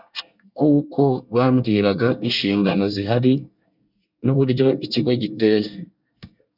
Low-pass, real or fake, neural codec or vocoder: 5.4 kHz; fake; codec, 24 kHz, 1 kbps, SNAC